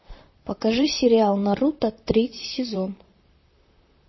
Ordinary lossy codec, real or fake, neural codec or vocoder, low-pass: MP3, 24 kbps; fake; vocoder, 44.1 kHz, 128 mel bands, Pupu-Vocoder; 7.2 kHz